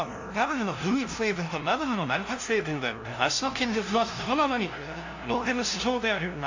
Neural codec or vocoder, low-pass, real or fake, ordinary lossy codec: codec, 16 kHz, 0.5 kbps, FunCodec, trained on LibriTTS, 25 frames a second; 7.2 kHz; fake; AAC, 48 kbps